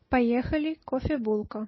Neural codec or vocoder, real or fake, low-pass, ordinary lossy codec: none; real; 7.2 kHz; MP3, 24 kbps